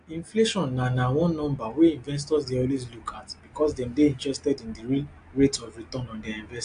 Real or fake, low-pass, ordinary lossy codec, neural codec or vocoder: real; 9.9 kHz; AAC, 64 kbps; none